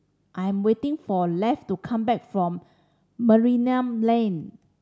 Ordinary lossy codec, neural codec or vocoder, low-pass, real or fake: none; none; none; real